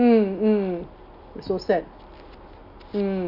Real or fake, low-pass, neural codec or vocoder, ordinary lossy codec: real; 5.4 kHz; none; Opus, 64 kbps